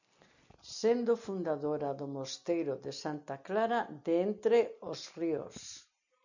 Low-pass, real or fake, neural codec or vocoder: 7.2 kHz; real; none